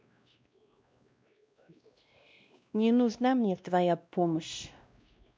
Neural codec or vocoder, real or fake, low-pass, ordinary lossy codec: codec, 16 kHz, 1 kbps, X-Codec, WavLM features, trained on Multilingual LibriSpeech; fake; none; none